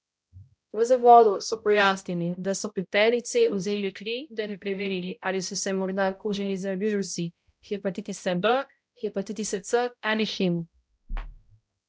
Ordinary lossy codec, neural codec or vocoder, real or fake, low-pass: none; codec, 16 kHz, 0.5 kbps, X-Codec, HuBERT features, trained on balanced general audio; fake; none